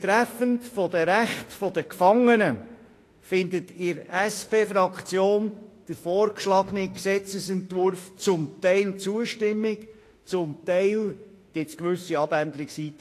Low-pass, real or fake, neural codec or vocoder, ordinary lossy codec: 14.4 kHz; fake; autoencoder, 48 kHz, 32 numbers a frame, DAC-VAE, trained on Japanese speech; AAC, 48 kbps